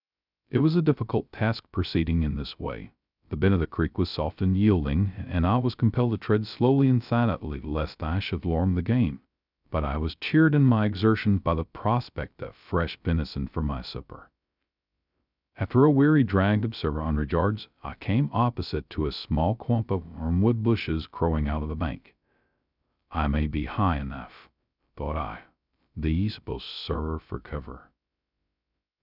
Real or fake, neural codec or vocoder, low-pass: fake; codec, 16 kHz, 0.2 kbps, FocalCodec; 5.4 kHz